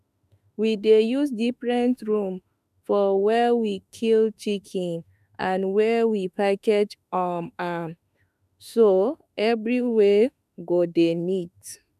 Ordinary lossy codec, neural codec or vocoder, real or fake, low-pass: none; autoencoder, 48 kHz, 32 numbers a frame, DAC-VAE, trained on Japanese speech; fake; 14.4 kHz